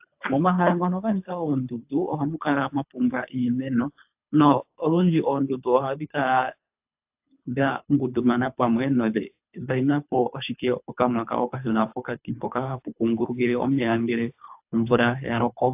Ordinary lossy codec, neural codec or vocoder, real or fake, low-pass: AAC, 32 kbps; codec, 24 kHz, 3 kbps, HILCodec; fake; 3.6 kHz